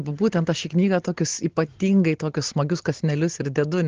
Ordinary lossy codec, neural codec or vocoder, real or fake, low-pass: Opus, 16 kbps; none; real; 7.2 kHz